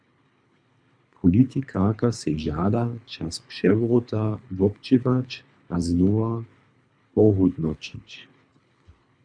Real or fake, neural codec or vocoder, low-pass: fake; codec, 24 kHz, 3 kbps, HILCodec; 9.9 kHz